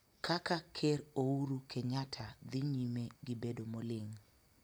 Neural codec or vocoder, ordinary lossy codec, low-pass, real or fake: none; none; none; real